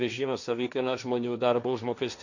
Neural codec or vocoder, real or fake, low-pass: codec, 16 kHz, 1.1 kbps, Voila-Tokenizer; fake; 7.2 kHz